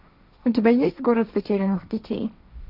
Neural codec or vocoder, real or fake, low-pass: codec, 16 kHz, 1.1 kbps, Voila-Tokenizer; fake; 5.4 kHz